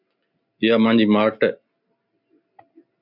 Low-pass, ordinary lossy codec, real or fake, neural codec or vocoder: 5.4 kHz; MP3, 48 kbps; real; none